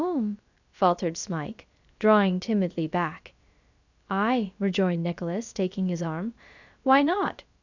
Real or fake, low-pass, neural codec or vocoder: fake; 7.2 kHz; codec, 16 kHz, about 1 kbps, DyCAST, with the encoder's durations